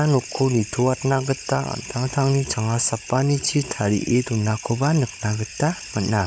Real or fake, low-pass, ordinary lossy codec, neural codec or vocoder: fake; none; none; codec, 16 kHz, 16 kbps, FunCodec, trained on Chinese and English, 50 frames a second